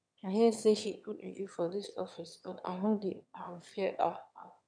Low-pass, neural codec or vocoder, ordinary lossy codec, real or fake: 9.9 kHz; autoencoder, 22.05 kHz, a latent of 192 numbers a frame, VITS, trained on one speaker; none; fake